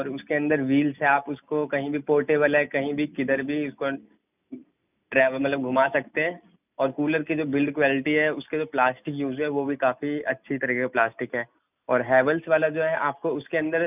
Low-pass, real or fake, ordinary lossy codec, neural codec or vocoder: 3.6 kHz; real; none; none